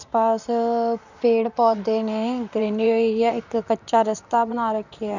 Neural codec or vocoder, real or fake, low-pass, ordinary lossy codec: vocoder, 44.1 kHz, 128 mel bands, Pupu-Vocoder; fake; 7.2 kHz; none